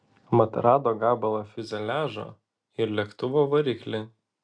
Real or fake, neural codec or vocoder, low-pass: real; none; 9.9 kHz